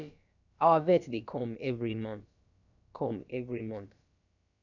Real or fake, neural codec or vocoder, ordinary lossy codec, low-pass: fake; codec, 16 kHz, about 1 kbps, DyCAST, with the encoder's durations; none; 7.2 kHz